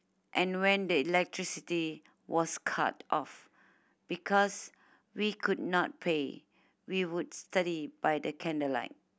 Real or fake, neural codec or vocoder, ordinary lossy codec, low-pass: real; none; none; none